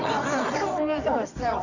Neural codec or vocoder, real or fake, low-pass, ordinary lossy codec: codec, 24 kHz, 0.9 kbps, WavTokenizer, medium music audio release; fake; 7.2 kHz; none